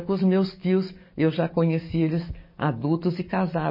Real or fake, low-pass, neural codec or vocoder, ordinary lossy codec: fake; 5.4 kHz; codec, 44.1 kHz, 7.8 kbps, DAC; MP3, 24 kbps